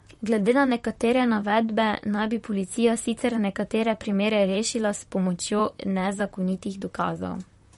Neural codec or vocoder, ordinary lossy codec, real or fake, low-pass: vocoder, 44.1 kHz, 128 mel bands, Pupu-Vocoder; MP3, 48 kbps; fake; 19.8 kHz